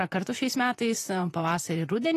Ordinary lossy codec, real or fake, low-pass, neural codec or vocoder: AAC, 48 kbps; fake; 14.4 kHz; vocoder, 44.1 kHz, 128 mel bands, Pupu-Vocoder